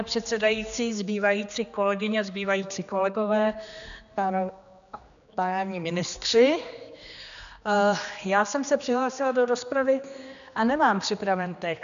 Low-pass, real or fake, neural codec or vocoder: 7.2 kHz; fake; codec, 16 kHz, 2 kbps, X-Codec, HuBERT features, trained on general audio